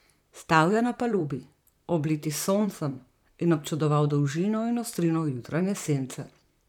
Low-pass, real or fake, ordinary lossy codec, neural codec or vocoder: 19.8 kHz; fake; none; vocoder, 44.1 kHz, 128 mel bands, Pupu-Vocoder